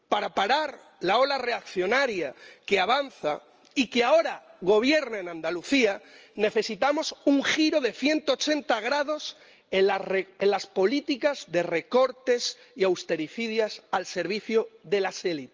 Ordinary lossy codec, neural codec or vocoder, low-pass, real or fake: Opus, 24 kbps; none; 7.2 kHz; real